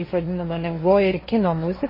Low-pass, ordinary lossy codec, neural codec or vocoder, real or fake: 5.4 kHz; MP3, 24 kbps; codec, 16 kHz, 1.1 kbps, Voila-Tokenizer; fake